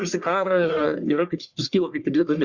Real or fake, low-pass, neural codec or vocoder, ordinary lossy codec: fake; 7.2 kHz; codec, 44.1 kHz, 1.7 kbps, Pupu-Codec; Opus, 64 kbps